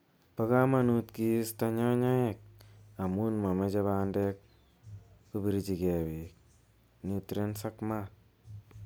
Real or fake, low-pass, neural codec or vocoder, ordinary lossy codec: real; none; none; none